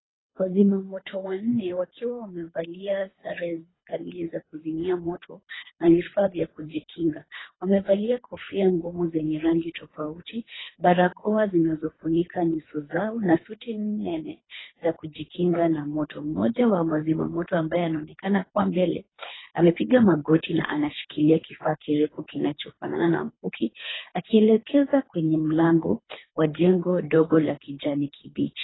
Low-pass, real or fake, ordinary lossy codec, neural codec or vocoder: 7.2 kHz; fake; AAC, 16 kbps; codec, 24 kHz, 3 kbps, HILCodec